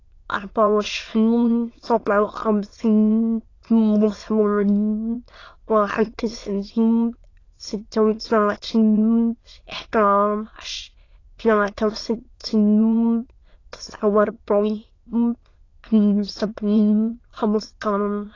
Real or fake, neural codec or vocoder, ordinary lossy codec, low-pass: fake; autoencoder, 22.05 kHz, a latent of 192 numbers a frame, VITS, trained on many speakers; AAC, 32 kbps; 7.2 kHz